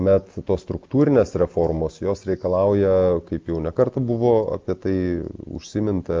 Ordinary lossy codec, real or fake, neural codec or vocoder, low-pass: Opus, 24 kbps; real; none; 7.2 kHz